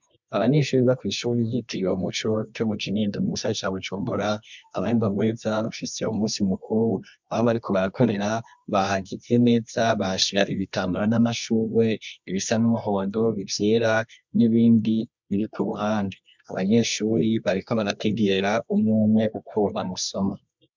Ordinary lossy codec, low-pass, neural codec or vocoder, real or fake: MP3, 64 kbps; 7.2 kHz; codec, 24 kHz, 0.9 kbps, WavTokenizer, medium music audio release; fake